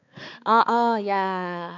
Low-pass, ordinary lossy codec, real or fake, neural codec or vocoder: 7.2 kHz; none; fake; codec, 16 kHz, 4 kbps, X-Codec, HuBERT features, trained on balanced general audio